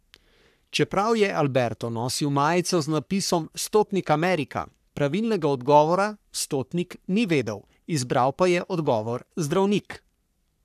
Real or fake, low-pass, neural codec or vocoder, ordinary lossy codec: fake; 14.4 kHz; codec, 44.1 kHz, 3.4 kbps, Pupu-Codec; none